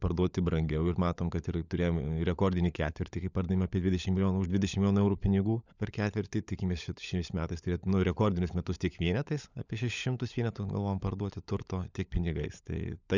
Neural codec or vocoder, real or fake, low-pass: codec, 16 kHz, 16 kbps, FunCodec, trained on LibriTTS, 50 frames a second; fake; 7.2 kHz